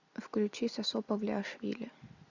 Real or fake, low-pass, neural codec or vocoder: real; 7.2 kHz; none